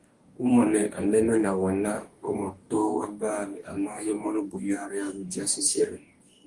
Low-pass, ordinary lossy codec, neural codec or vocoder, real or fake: 10.8 kHz; Opus, 24 kbps; codec, 44.1 kHz, 2.6 kbps, DAC; fake